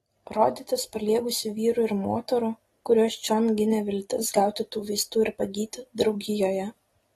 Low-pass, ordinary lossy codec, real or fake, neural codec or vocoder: 19.8 kHz; AAC, 32 kbps; real; none